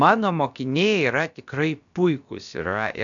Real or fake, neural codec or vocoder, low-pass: fake; codec, 16 kHz, about 1 kbps, DyCAST, with the encoder's durations; 7.2 kHz